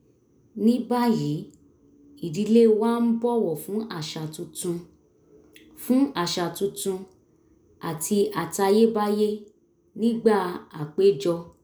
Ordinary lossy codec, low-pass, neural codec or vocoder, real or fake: none; none; none; real